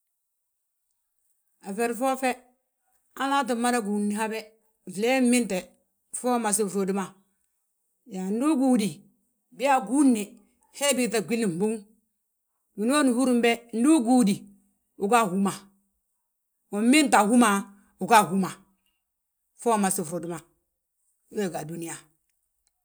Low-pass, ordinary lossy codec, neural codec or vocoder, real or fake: none; none; none; real